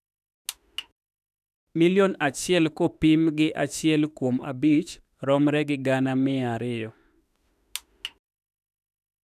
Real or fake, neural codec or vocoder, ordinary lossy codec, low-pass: fake; autoencoder, 48 kHz, 32 numbers a frame, DAC-VAE, trained on Japanese speech; none; 14.4 kHz